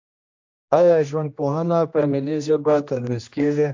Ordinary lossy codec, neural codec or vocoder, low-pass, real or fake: AAC, 48 kbps; codec, 16 kHz, 1 kbps, X-Codec, HuBERT features, trained on general audio; 7.2 kHz; fake